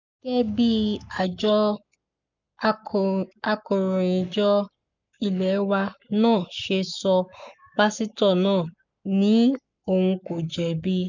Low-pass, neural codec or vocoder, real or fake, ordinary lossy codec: 7.2 kHz; codec, 44.1 kHz, 7.8 kbps, Pupu-Codec; fake; none